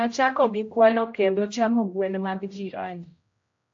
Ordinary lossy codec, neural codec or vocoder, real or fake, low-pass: AAC, 48 kbps; codec, 16 kHz, 0.5 kbps, X-Codec, HuBERT features, trained on general audio; fake; 7.2 kHz